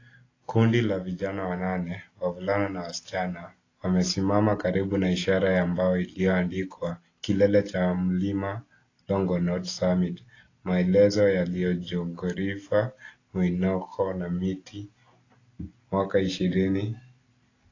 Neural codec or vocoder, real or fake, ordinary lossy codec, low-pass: none; real; AAC, 32 kbps; 7.2 kHz